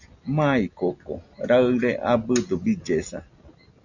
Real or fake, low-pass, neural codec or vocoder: real; 7.2 kHz; none